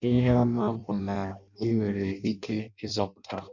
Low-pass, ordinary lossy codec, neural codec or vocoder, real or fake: 7.2 kHz; none; codec, 16 kHz in and 24 kHz out, 0.6 kbps, FireRedTTS-2 codec; fake